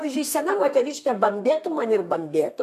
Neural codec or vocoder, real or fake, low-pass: codec, 32 kHz, 1.9 kbps, SNAC; fake; 14.4 kHz